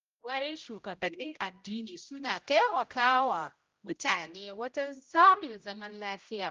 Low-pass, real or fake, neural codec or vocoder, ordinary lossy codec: 7.2 kHz; fake; codec, 16 kHz, 0.5 kbps, X-Codec, HuBERT features, trained on general audio; Opus, 32 kbps